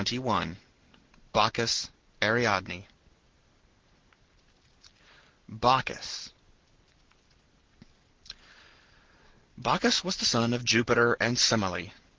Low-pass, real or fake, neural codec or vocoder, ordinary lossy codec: 7.2 kHz; real; none; Opus, 16 kbps